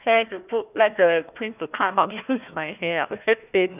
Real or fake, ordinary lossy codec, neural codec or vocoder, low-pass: fake; none; codec, 16 kHz, 1 kbps, FunCodec, trained on Chinese and English, 50 frames a second; 3.6 kHz